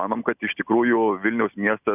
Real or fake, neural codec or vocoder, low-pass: real; none; 3.6 kHz